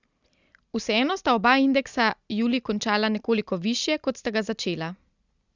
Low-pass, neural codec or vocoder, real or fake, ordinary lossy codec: 7.2 kHz; none; real; Opus, 64 kbps